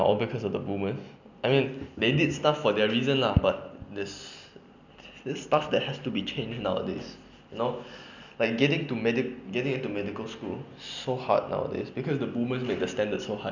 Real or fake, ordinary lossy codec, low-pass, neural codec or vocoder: real; none; 7.2 kHz; none